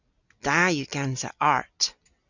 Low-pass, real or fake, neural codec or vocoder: 7.2 kHz; real; none